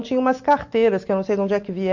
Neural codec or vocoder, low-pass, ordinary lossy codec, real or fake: none; 7.2 kHz; MP3, 48 kbps; real